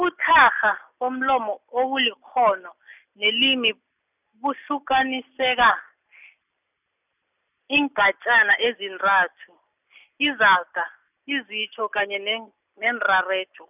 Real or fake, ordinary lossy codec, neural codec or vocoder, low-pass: real; none; none; 3.6 kHz